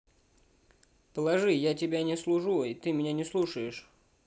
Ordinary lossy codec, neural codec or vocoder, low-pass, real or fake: none; none; none; real